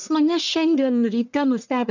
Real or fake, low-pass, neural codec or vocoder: fake; 7.2 kHz; codec, 44.1 kHz, 1.7 kbps, Pupu-Codec